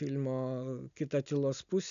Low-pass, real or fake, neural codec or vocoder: 7.2 kHz; real; none